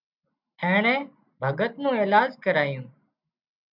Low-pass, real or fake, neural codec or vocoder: 5.4 kHz; real; none